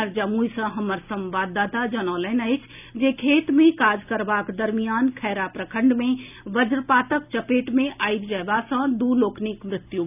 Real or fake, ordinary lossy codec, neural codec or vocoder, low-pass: real; none; none; 3.6 kHz